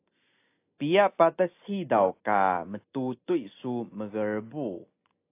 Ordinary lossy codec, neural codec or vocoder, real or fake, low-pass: AAC, 24 kbps; none; real; 3.6 kHz